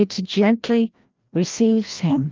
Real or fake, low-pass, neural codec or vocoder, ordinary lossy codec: fake; 7.2 kHz; codec, 16 kHz, 1 kbps, FreqCodec, larger model; Opus, 24 kbps